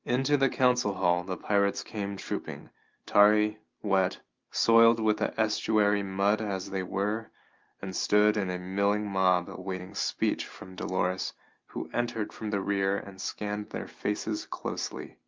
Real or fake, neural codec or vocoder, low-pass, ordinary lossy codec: real; none; 7.2 kHz; Opus, 32 kbps